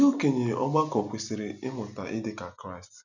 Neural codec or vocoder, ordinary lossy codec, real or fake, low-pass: none; none; real; 7.2 kHz